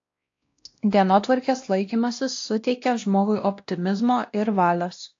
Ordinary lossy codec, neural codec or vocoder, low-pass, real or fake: AAC, 48 kbps; codec, 16 kHz, 1 kbps, X-Codec, WavLM features, trained on Multilingual LibriSpeech; 7.2 kHz; fake